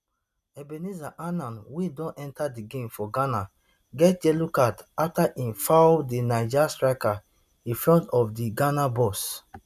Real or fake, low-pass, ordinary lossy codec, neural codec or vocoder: real; 14.4 kHz; none; none